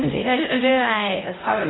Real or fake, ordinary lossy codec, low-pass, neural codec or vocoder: fake; AAC, 16 kbps; 7.2 kHz; codec, 16 kHz, 0.5 kbps, X-Codec, HuBERT features, trained on LibriSpeech